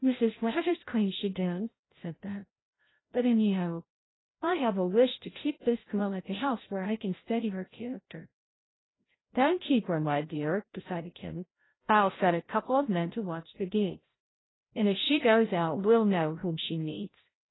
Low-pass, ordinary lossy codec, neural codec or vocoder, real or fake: 7.2 kHz; AAC, 16 kbps; codec, 16 kHz, 0.5 kbps, FreqCodec, larger model; fake